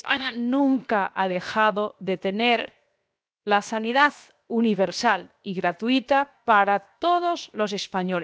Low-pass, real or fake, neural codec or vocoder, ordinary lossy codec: none; fake; codec, 16 kHz, 0.7 kbps, FocalCodec; none